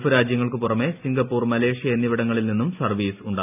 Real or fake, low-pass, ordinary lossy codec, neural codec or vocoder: real; 3.6 kHz; none; none